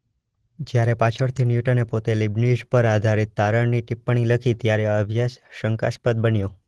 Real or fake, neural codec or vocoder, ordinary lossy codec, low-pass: real; none; Opus, 16 kbps; 10.8 kHz